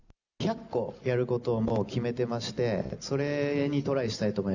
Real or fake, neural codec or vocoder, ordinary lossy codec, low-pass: real; none; none; 7.2 kHz